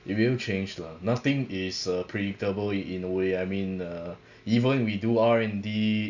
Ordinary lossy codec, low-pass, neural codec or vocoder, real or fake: none; 7.2 kHz; none; real